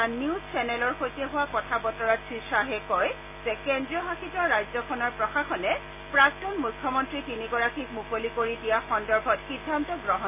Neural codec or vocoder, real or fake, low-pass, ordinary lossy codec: none; real; 3.6 kHz; AAC, 32 kbps